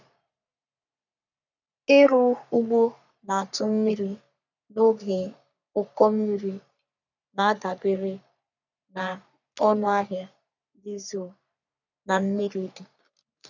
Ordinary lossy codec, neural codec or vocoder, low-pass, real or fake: none; codec, 44.1 kHz, 3.4 kbps, Pupu-Codec; 7.2 kHz; fake